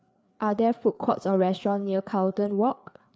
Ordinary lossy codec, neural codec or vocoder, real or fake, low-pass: none; codec, 16 kHz, 4 kbps, FreqCodec, larger model; fake; none